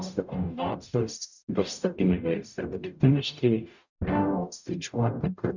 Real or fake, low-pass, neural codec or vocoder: fake; 7.2 kHz; codec, 44.1 kHz, 0.9 kbps, DAC